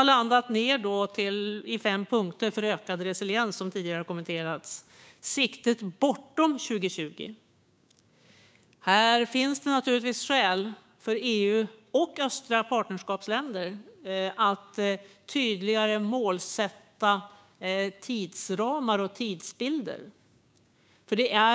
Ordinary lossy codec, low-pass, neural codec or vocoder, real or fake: none; none; codec, 16 kHz, 6 kbps, DAC; fake